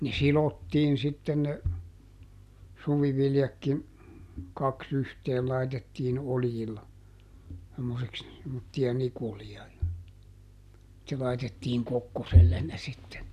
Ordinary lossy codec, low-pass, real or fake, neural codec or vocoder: none; 14.4 kHz; real; none